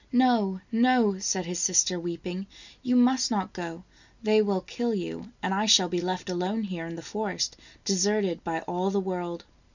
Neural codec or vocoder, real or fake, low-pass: none; real; 7.2 kHz